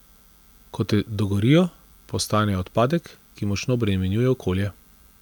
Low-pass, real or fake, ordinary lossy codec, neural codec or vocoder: none; real; none; none